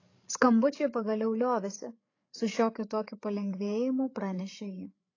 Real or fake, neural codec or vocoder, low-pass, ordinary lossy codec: fake; codec, 16 kHz, 8 kbps, FreqCodec, larger model; 7.2 kHz; AAC, 32 kbps